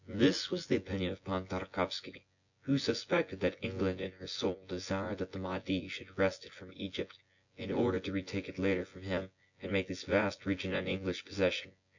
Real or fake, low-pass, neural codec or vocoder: fake; 7.2 kHz; vocoder, 24 kHz, 100 mel bands, Vocos